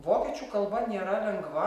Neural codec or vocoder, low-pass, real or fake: none; 14.4 kHz; real